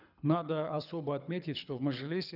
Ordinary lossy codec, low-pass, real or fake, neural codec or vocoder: none; 5.4 kHz; fake; codec, 24 kHz, 6 kbps, HILCodec